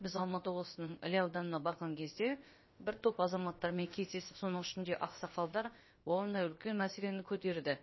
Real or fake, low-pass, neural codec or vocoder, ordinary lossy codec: fake; 7.2 kHz; codec, 16 kHz, about 1 kbps, DyCAST, with the encoder's durations; MP3, 24 kbps